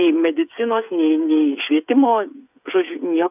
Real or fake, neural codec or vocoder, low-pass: fake; codec, 16 kHz, 8 kbps, FreqCodec, smaller model; 3.6 kHz